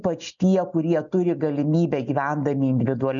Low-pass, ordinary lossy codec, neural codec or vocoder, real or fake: 7.2 kHz; MP3, 96 kbps; none; real